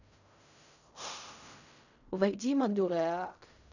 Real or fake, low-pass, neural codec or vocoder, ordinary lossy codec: fake; 7.2 kHz; codec, 16 kHz in and 24 kHz out, 0.4 kbps, LongCat-Audio-Codec, fine tuned four codebook decoder; none